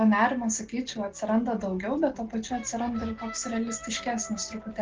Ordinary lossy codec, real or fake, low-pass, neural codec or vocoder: Opus, 16 kbps; real; 7.2 kHz; none